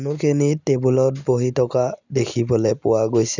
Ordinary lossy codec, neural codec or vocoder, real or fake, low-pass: none; none; real; 7.2 kHz